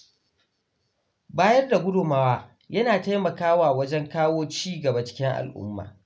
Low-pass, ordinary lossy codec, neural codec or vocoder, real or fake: none; none; none; real